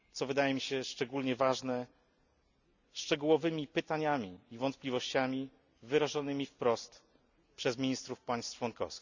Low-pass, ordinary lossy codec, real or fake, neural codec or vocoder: 7.2 kHz; none; real; none